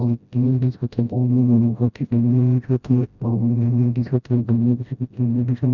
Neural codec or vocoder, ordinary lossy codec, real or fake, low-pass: codec, 16 kHz, 0.5 kbps, FreqCodec, smaller model; none; fake; 7.2 kHz